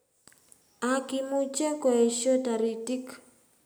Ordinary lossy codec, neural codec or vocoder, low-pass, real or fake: none; none; none; real